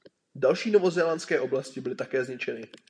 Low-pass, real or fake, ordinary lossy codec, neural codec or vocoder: 9.9 kHz; real; MP3, 96 kbps; none